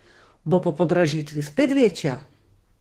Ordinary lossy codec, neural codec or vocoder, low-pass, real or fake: Opus, 16 kbps; codec, 32 kHz, 1.9 kbps, SNAC; 14.4 kHz; fake